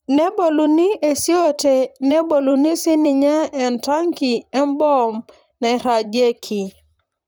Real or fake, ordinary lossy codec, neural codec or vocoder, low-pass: fake; none; vocoder, 44.1 kHz, 128 mel bands, Pupu-Vocoder; none